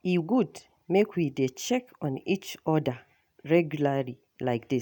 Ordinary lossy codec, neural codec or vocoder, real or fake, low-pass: none; none; real; 19.8 kHz